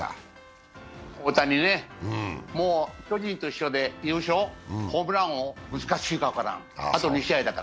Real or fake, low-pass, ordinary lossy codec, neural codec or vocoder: real; none; none; none